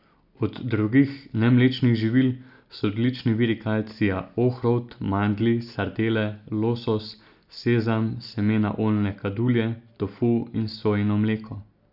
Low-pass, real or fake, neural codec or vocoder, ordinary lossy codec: 5.4 kHz; real; none; none